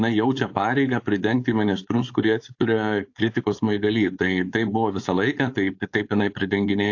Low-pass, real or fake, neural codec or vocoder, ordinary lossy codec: 7.2 kHz; fake; codec, 16 kHz, 4.8 kbps, FACodec; AAC, 48 kbps